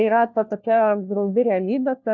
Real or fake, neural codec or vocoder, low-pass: fake; codec, 16 kHz, 1 kbps, FunCodec, trained on LibriTTS, 50 frames a second; 7.2 kHz